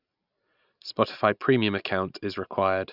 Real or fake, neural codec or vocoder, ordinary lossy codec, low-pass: real; none; none; 5.4 kHz